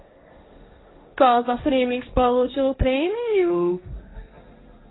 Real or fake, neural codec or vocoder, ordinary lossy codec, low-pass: fake; codec, 16 kHz, 1.1 kbps, Voila-Tokenizer; AAC, 16 kbps; 7.2 kHz